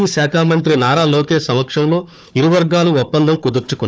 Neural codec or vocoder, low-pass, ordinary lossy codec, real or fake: codec, 16 kHz, 4 kbps, FunCodec, trained on Chinese and English, 50 frames a second; none; none; fake